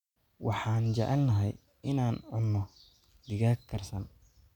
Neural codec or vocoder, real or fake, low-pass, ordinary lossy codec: none; real; 19.8 kHz; none